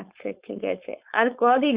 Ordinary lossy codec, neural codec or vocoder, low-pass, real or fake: Opus, 64 kbps; codec, 16 kHz, 4.8 kbps, FACodec; 3.6 kHz; fake